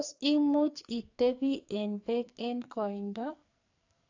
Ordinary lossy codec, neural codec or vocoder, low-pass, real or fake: AAC, 48 kbps; codec, 44.1 kHz, 2.6 kbps, SNAC; 7.2 kHz; fake